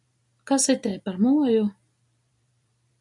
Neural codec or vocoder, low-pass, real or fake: vocoder, 44.1 kHz, 128 mel bands every 256 samples, BigVGAN v2; 10.8 kHz; fake